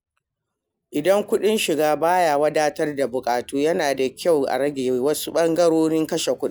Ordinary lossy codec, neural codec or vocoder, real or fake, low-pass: none; none; real; none